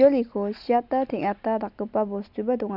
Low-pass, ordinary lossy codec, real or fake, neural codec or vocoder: 5.4 kHz; none; real; none